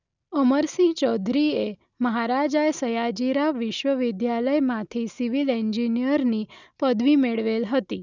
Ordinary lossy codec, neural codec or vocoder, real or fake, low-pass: none; none; real; 7.2 kHz